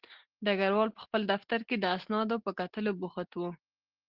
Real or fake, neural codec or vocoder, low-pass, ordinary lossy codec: real; none; 5.4 kHz; Opus, 16 kbps